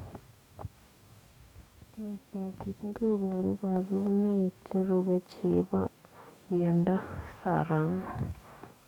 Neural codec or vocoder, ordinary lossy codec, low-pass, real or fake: codec, 44.1 kHz, 2.6 kbps, DAC; none; 19.8 kHz; fake